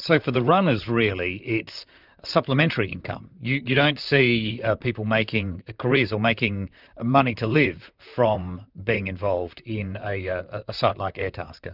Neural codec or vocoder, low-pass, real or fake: vocoder, 44.1 kHz, 128 mel bands, Pupu-Vocoder; 5.4 kHz; fake